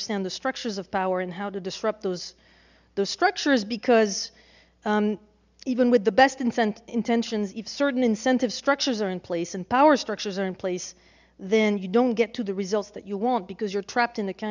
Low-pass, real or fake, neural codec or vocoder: 7.2 kHz; real; none